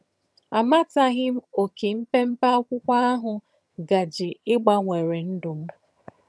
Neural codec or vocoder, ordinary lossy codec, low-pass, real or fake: vocoder, 22.05 kHz, 80 mel bands, HiFi-GAN; none; none; fake